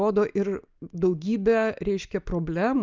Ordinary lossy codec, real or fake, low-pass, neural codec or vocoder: Opus, 24 kbps; real; 7.2 kHz; none